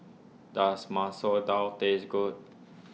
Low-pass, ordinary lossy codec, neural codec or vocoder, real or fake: none; none; none; real